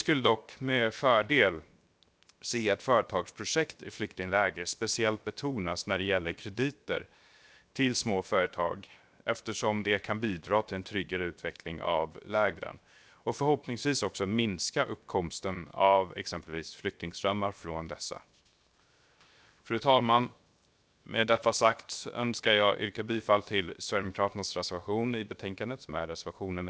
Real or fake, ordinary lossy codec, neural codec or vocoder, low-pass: fake; none; codec, 16 kHz, 0.7 kbps, FocalCodec; none